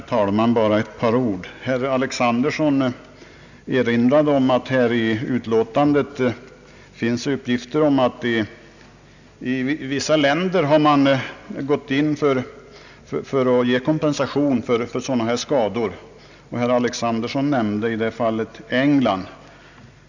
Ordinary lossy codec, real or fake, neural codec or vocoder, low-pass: none; real; none; 7.2 kHz